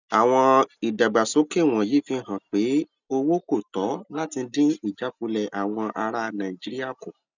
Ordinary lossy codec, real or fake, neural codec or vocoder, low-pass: none; real; none; 7.2 kHz